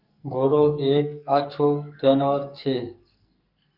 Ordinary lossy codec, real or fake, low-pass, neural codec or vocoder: Opus, 64 kbps; fake; 5.4 kHz; codec, 44.1 kHz, 2.6 kbps, SNAC